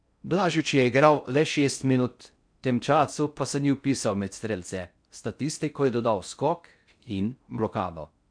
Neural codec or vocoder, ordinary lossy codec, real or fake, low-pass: codec, 16 kHz in and 24 kHz out, 0.6 kbps, FocalCodec, streaming, 4096 codes; none; fake; 9.9 kHz